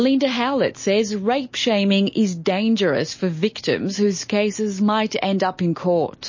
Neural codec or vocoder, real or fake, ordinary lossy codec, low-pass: none; real; MP3, 32 kbps; 7.2 kHz